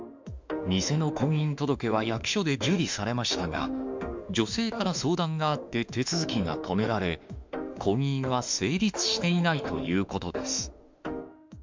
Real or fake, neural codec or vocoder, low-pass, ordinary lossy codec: fake; autoencoder, 48 kHz, 32 numbers a frame, DAC-VAE, trained on Japanese speech; 7.2 kHz; MP3, 64 kbps